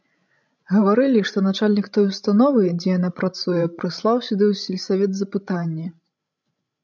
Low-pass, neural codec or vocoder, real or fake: 7.2 kHz; codec, 16 kHz, 16 kbps, FreqCodec, larger model; fake